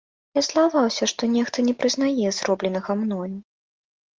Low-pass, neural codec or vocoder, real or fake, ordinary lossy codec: 7.2 kHz; none; real; Opus, 24 kbps